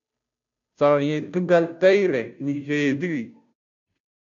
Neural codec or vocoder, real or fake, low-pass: codec, 16 kHz, 0.5 kbps, FunCodec, trained on Chinese and English, 25 frames a second; fake; 7.2 kHz